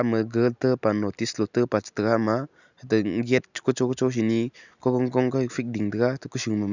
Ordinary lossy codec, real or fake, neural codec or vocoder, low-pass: none; real; none; 7.2 kHz